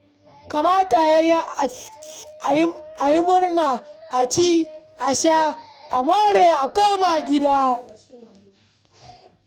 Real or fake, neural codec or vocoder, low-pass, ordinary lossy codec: fake; codec, 44.1 kHz, 2.6 kbps, DAC; 19.8 kHz; none